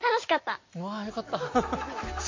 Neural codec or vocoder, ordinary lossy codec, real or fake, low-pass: none; MP3, 32 kbps; real; 7.2 kHz